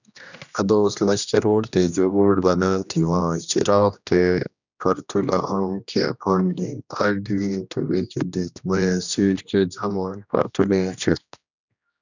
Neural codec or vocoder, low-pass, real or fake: codec, 16 kHz, 1 kbps, X-Codec, HuBERT features, trained on general audio; 7.2 kHz; fake